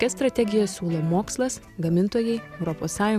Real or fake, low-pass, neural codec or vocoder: real; 14.4 kHz; none